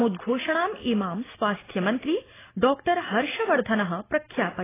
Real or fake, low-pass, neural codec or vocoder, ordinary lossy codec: real; 3.6 kHz; none; AAC, 16 kbps